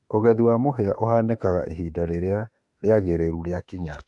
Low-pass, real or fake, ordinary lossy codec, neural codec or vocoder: 10.8 kHz; fake; AAC, 64 kbps; autoencoder, 48 kHz, 32 numbers a frame, DAC-VAE, trained on Japanese speech